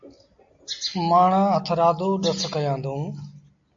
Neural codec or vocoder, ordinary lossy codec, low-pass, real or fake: none; AAC, 64 kbps; 7.2 kHz; real